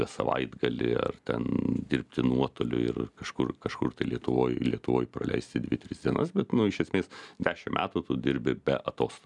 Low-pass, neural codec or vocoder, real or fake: 10.8 kHz; none; real